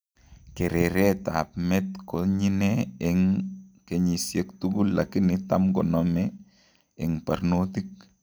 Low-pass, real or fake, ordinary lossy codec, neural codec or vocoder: none; real; none; none